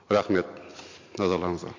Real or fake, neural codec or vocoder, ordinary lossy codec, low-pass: real; none; MP3, 48 kbps; 7.2 kHz